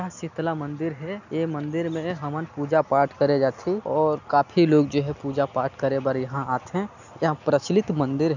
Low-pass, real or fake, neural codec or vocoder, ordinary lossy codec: 7.2 kHz; real; none; none